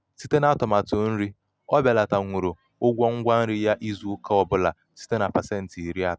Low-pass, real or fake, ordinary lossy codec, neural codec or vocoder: none; real; none; none